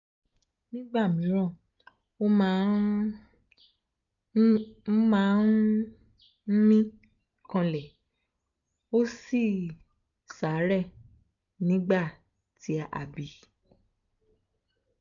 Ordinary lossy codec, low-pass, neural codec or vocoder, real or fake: none; 7.2 kHz; none; real